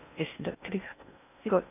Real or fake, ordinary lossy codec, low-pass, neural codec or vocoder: fake; none; 3.6 kHz; codec, 16 kHz in and 24 kHz out, 0.6 kbps, FocalCodec, streaming, 4096 codes